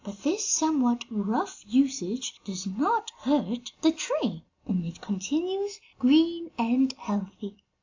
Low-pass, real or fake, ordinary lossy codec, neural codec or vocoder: 7.2 kHz; real; AAC, 48 kbps; none